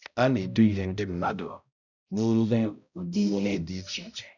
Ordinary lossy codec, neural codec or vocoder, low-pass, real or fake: none; codec, 16 kHz, 0.5 kbps, X-Codec, HuBERT features, trained on balanced general audio; 7.2 kHz; fake